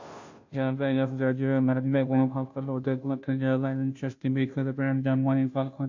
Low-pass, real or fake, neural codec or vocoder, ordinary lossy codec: 7.2 kHz; fake; codec, 16 kHz, 0.5 kbps, FunCodec, trained on Chinese and English, 25 frames a second; AAC, 48 kbps